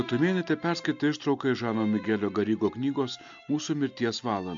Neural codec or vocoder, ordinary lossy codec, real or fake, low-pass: none; MP3, 64 kbps; real; 7.2 kHz